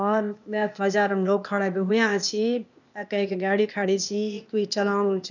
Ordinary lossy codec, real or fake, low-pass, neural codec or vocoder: none; fake; 7.2 kHz; codec, 16 kHz, 0.8 kbps, ZipCodec